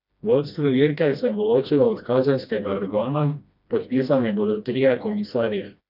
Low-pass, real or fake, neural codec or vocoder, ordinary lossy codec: 5.4 kHz; fake; codec, 16 kHz, 1 kbps, FreqCodec, smaller model; none